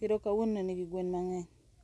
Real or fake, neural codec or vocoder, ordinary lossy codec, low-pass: real; none; none; none